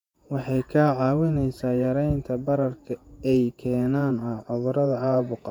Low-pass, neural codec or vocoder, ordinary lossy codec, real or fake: 19.8 kHz; vocoder, 48 kHz, 128 mel bands, Vocos; MP3, 96 kbps; fake